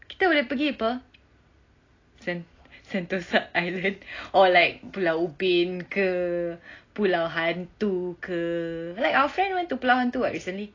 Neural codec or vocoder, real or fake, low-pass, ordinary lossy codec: none; real; 7.2 kHz; AAC, 32 kbps